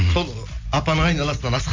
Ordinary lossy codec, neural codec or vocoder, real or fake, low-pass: MP3, 64 kbps; none; real; 7.2 kHz